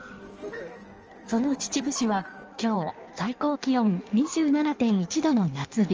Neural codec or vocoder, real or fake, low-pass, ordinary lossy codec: codec, 16 kHz in and 24 kHz out, 1.1 kbps, FireRedTTS-2 codec; fake; 7.2 kHz; Opus, 24 kbps